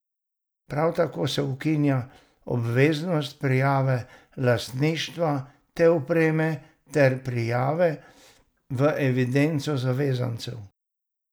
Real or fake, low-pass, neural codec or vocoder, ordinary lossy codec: real; none; none; none